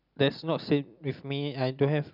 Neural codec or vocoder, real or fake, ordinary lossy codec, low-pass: none; real; none; 5.4 kHz